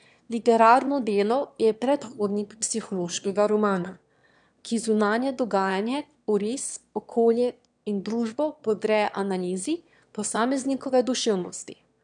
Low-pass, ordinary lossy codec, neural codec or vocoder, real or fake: 9.9 kHz; none; autoencoder, 22.05 kHz, a latent of 192 numbers a frame, VITS, trained on one speaker; fake